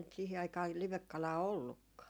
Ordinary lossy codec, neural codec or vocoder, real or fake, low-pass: none; none; real; none